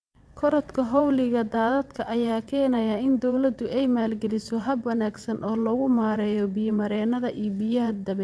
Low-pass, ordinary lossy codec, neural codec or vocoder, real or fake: none; none; vocoder, 22.05 kHz, 80 mel bands, WaveNeXt; fake